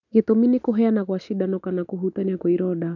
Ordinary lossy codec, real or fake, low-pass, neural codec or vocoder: none; real; 7.2 kHz; none